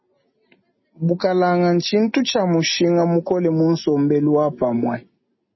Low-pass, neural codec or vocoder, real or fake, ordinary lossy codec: 7.2 kHz; none; real; MP3, 24 kbps